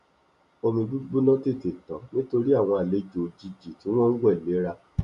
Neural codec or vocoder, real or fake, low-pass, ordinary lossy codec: none; real; 10.8 kHz; none